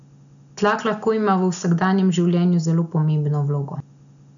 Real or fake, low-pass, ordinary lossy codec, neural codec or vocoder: real; 7.2 kHz; none; none